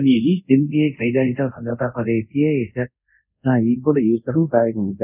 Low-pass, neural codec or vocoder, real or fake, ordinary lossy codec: 3.6 kHz; codec, 24 kHz, 0.5 kbps, DualCodec; fake; none